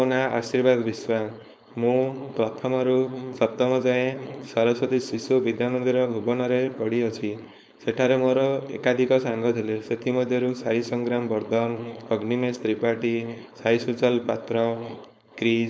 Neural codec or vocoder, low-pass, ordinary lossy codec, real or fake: codec, 16 kHz, 4.8 kbps, FACodec; none; none; fake